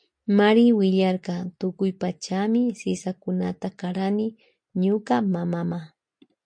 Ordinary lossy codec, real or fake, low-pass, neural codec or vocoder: MP3, 48 kbps; real; 9.9 kHz; none